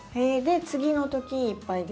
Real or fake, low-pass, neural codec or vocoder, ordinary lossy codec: real; none; none; none